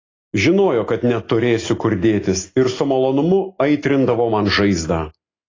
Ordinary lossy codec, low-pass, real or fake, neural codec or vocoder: AAC, 32 kbps; 7.2 kHz; real; none